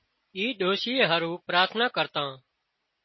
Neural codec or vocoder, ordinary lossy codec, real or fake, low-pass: none; MP3, 24 kbps; real; 7.2 kHz